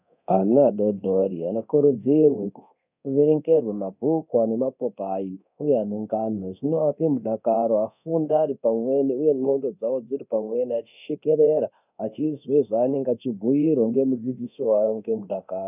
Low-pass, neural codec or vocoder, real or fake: 3.6 kHz; codec, 24 kHz, 0.9 kbps, DualCodec; fake